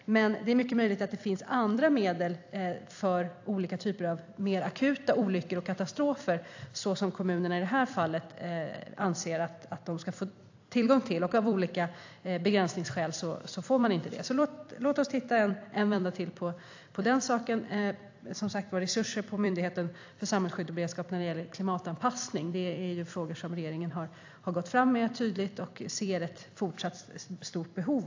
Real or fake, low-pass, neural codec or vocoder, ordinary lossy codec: fake; 7.2 kHz; vocoder, 44.1 kHz, 128 mel bands every 256 samples, BigVGAN v2; AAC, 48 kbps